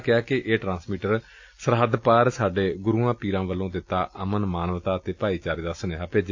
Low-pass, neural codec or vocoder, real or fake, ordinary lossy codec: 7.2 kHz; none; real; AAC, 48 kbps